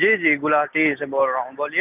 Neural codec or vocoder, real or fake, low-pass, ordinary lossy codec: none; real; 3.6 kHz; none